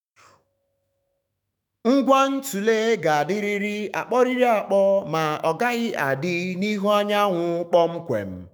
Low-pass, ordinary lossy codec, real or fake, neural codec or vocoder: none; none; fake; autoencoder, 48 kHz, 128 numbers a frame, DAC-VAE, trained on Japanese speech